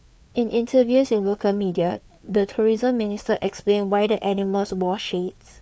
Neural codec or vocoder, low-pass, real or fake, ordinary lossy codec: codec, 16 kHz, 4 kbps, FreqCodec, larger model; none; fake; none